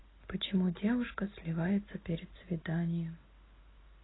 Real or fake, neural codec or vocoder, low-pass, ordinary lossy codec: real; none; 7.2 kHz; AAC, 16 kbps